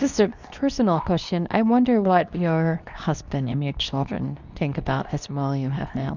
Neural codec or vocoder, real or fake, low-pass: codec, 24 kHz, 0.9 kbps, WavTokenizer, medium speech release version 2; fake; 7.2 kHz